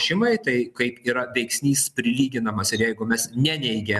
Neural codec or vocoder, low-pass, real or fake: none; 14.4 kHz; real